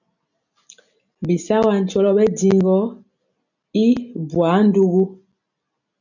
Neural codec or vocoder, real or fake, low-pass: none; real; 7.2 kHz